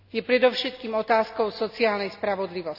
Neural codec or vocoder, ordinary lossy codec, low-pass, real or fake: none; none; 5.4 kHz; real